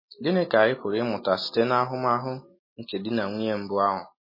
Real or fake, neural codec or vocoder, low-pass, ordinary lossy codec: real; none; 5.4 kHz; MP3, 24 kbps